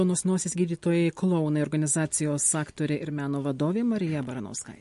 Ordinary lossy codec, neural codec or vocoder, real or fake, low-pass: MP3, 48 kbps; none; real; 14.4 kHz